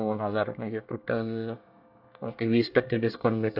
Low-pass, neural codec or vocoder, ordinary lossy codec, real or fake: 5.4 kHz; codec, 24 kHz, 1 kbps, SNAC; AAC, 48 kbps; fake